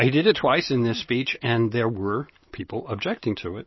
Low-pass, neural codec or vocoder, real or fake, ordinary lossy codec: 7.2 kHz; none; real; MP3, 24 kbps